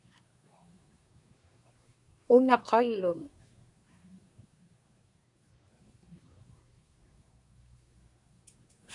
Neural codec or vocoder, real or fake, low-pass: codec, 24 kHz, 1 kbps, SNAC; fake; 10.8 kHz